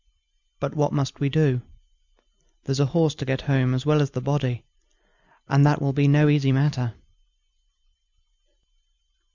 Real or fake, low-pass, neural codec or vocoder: real; 7.2 kHz; none